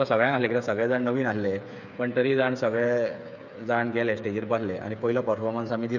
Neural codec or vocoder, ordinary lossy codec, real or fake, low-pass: codec, 16 kHz, 8 kbps, FreqCodec, smaller model; none; fake; 7.2 kHz